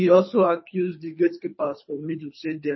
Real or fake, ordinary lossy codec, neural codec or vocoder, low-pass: fake; MP3, 24 kbps; codec, 24 kHz, 3 kbps, HILCodec; 7.2 kHz